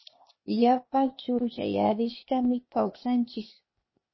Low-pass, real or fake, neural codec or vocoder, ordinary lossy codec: 7.2 kHz; fake; codec, 16 kHz, 0.8 kbps, ZipCodec; MP3, 24 kbps